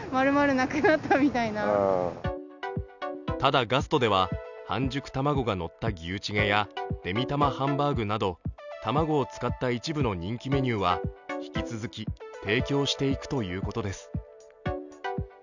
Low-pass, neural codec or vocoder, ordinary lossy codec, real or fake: 7.2 kHz; none; none; real